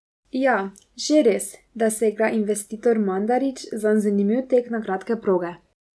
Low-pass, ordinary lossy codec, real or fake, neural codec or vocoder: none; none; real; none